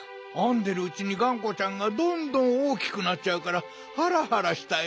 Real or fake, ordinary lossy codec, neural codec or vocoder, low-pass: real; none; none; none